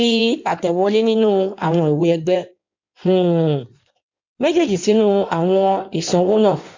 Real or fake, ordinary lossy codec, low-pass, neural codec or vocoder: fake; MP3, 64 kbps; 7.2 kHz; codec, 16 kHz in and 24 kHz out, 1.1 kbps, FireRedTTS-2 codec